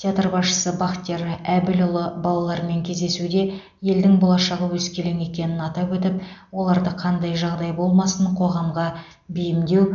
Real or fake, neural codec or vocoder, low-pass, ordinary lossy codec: real; none; 7.2 kHz; none